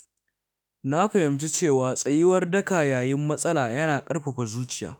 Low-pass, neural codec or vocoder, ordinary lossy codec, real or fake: none; autoencoder, 48 kHz, 32 numbers a frame, DAC-VAE, trained on Japanese speech; none; fake